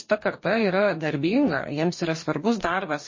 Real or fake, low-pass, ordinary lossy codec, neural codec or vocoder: fake; 7.2 kHz; MP3, 32 kbps; codec, 44.1 kHz, 2.6 kbps, DAC